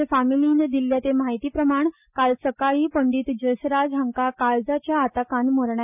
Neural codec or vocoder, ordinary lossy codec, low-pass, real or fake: none; none; 3.6 kHz; real